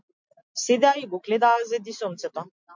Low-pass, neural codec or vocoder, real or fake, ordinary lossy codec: 7.2 kHz; none; real; MP3, 64 kbps